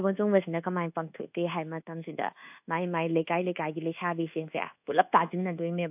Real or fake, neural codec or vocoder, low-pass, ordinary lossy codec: fake; codec, 24 kHz, 1.2 kbps, DualCodec; 3.6 kHz; AAC, 32 kbps